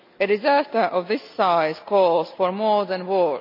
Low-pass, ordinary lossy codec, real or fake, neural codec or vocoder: 5.4 kHz; none; real; none